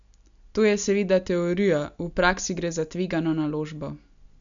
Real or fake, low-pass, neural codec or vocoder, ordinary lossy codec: real; 7.2 kHz; none; none